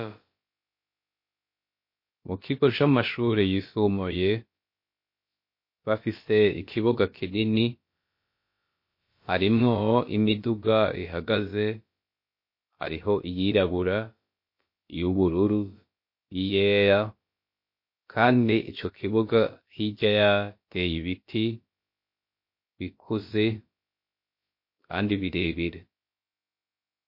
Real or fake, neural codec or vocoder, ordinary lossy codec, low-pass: fake; codec, 16 kHz, about 1 kbps, DyCAST, with the encoder's durations; MP3, 32 kbps; 5.4 kHz